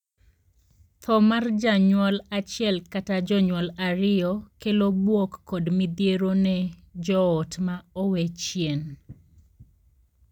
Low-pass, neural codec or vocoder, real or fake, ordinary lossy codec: 19.8 kHz; none; real; none